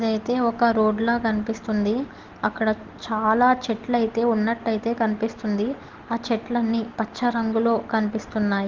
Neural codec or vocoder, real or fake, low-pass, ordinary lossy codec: none; real; 7.2 kHz; Opus, 16 kbps